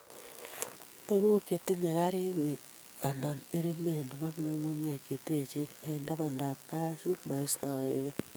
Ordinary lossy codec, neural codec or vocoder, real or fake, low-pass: none; codec, 44.1 kHz, 2.6 kbps, SNAC; fake; none